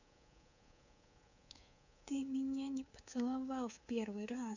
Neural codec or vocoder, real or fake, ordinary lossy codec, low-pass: codec, 24 kHz, 3.1 kbps, DualCodec; fake; none; 7.2 kHz